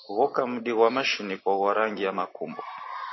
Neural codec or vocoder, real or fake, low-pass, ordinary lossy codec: none; real; 7.2 kHz; MP3, 24 kbps